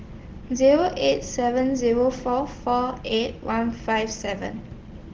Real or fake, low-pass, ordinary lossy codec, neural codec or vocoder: real; 7.2 kHz; Opus, 16 kbps; none